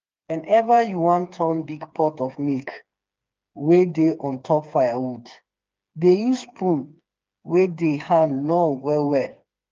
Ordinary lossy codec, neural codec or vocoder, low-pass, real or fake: Opus, 24 kbps; codec, 16 kHz, 4 kbps, FreqCodec, smaller model; 7.2 kHz; fake